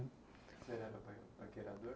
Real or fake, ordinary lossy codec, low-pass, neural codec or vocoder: real; none; none; none